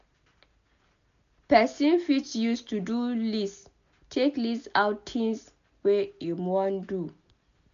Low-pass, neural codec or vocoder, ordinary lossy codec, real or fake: 7.2 kHz; none; none; real